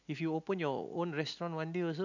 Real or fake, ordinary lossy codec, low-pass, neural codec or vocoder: real; none; 7.2 kHz; none